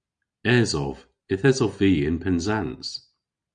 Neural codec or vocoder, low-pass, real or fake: none; 9.9 kHz; real